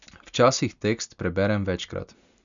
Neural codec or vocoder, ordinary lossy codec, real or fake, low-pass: none; none; real; 7.2 kHz